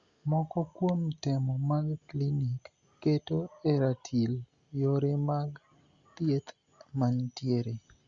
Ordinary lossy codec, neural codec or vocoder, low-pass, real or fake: Opus, 64 kbps; none; 7.2 kHz; real